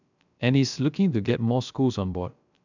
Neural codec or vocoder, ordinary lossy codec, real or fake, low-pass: codec, 16 kHz, 0.3 kbps, FocalCodec; none; fake; 7.2 kHz